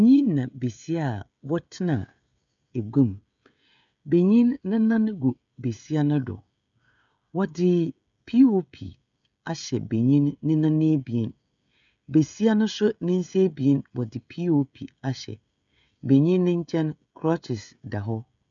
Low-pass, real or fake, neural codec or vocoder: 7.2 kHz; fake; codec, 16 kHz, 16 kbps, FreqCodec, smaller model